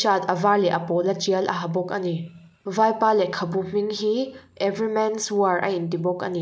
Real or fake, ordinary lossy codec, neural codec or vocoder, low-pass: real; none; none; none